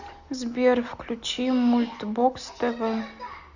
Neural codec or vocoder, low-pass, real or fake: none; 7.2 kHz; real